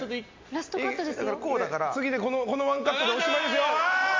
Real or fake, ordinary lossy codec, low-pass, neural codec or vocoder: real; MP3, 48 kbps; 7.2 kHz; none